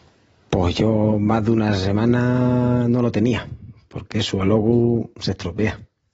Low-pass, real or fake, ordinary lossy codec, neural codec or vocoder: 10.8 kHz; real; AAC, 24 kbps; none